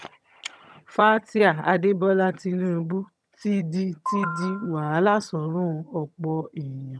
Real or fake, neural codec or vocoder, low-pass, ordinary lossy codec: fake; vocoder, 22.05 kHz, 80 mel bands, HiFi-GAN; none; none